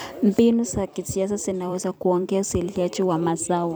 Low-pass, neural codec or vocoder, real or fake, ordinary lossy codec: none; vocoder, 44.1 kHz, 128 mel bands every 256 samples, BigVGAN v2; fake; none